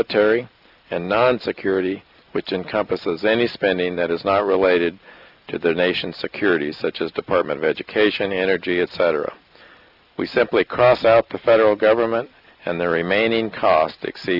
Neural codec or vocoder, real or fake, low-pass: none; real; 5.4 kHz